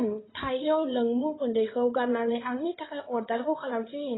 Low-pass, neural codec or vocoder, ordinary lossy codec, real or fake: 7.2 kHz; codec, 16 kHz in and 24 kHz out, 2.2 kbps, FireRedTTS-2 codec; AAC, 16 kbps; fake